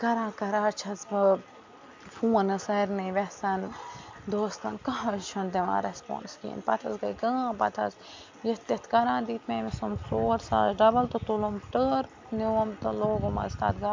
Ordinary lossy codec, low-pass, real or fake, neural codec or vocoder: MP3, 64 kbps; 7.2 kHz; real; none